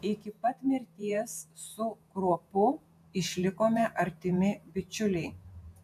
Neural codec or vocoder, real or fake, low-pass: vocoder, 48 kHz, 128 mel bands, Vocos; fake; 14.4 kHz